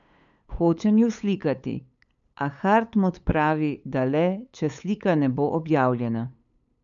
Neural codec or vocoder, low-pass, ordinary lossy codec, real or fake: codec, 16 kHz, 8 kbps, FunCodec, trained on LibriTTS, 25 frames a second; 7.2 kHz; none; fake